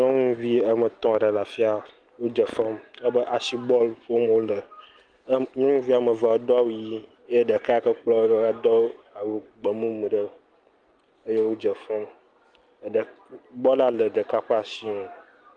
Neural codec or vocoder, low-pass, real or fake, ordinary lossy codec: none; 9.9 kHz; real; Opus, 24 kbps